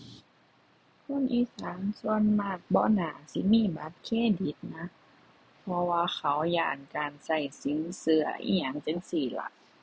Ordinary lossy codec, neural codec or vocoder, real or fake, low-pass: none; none; real; none